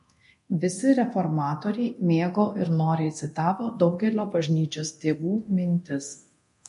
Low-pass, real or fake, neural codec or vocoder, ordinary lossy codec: 10.8 kHz; fake; codec, 24 kHz, 0.9 kbps, DualCodec; MP3, 48 kbps